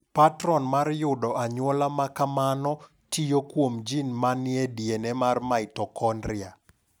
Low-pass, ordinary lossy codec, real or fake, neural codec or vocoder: none; none; real; none